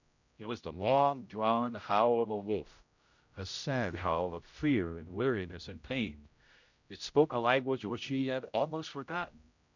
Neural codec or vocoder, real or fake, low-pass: codec, 16 kHz, 0.5 kbps, X-Codec, HuBERT features, trained on general audio; fake; 7.2 kHz